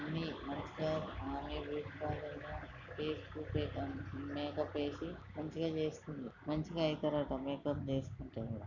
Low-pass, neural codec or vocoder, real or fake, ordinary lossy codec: 7.2 kHz; none; real; none